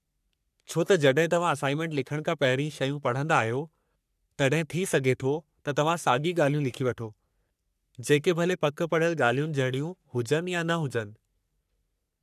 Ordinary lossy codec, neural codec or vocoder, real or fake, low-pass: none; codec, 44.1 kHz, 3.4 kbps, Pupu-Codec; fake; 14.4 kHz